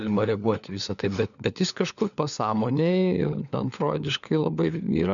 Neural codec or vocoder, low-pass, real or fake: codec, 16 kHz, 4 kbps, FunCodec, trained on LibriTTS, 50 frames a second; 7.2 kHz; fake